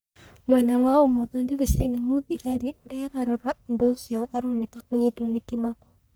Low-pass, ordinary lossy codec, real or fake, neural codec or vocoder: none; none; fake; codec, 44.1 kHz, 1.7 kbps, Pupu-Codec